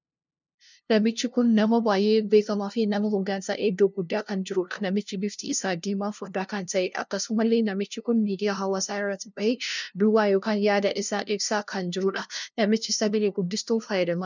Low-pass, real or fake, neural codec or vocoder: 7.2 kHz; fake; codec, 16 kHz, 0.5 kbps, FunCodec, trained on LibriTTS, 25 frames a second